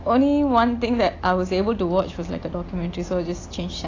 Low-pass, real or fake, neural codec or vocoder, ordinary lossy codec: 7.2 kHz; real; none; AAC, 32 kbps